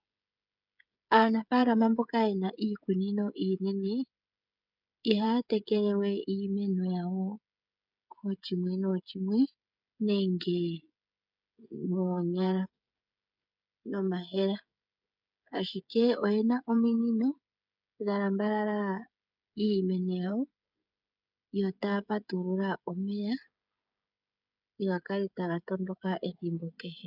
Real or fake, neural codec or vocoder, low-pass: fake; codec, 16 kHz, 16 kbps, FreqCodec, smaller model; 5.4 kHz